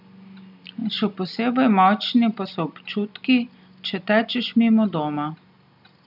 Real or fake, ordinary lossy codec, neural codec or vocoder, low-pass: real; none; none; 5.4 kHz